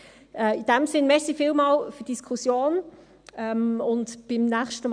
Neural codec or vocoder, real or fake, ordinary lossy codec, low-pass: none; real; none; 9.9 kHz